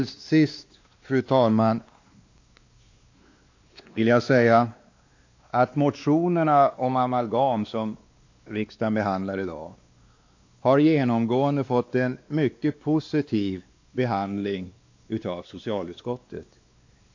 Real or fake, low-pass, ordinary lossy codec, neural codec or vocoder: fake; 7.2 kHz; AAC, 48 kbps; codec, 16 kHz, 2 kbps, X-Codec, WavLM features, trained on Multilingual LibriSpeech